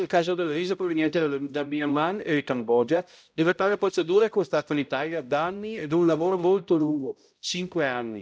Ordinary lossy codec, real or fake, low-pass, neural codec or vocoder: none; fake; none; codec, 16 kHz, 0.5 kbps, X-Codec, HuBERT features, trained on balanced general audio